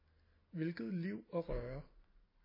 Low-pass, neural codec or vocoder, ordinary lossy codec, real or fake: 5.4 kHz; none; MP3, 24 kbps; real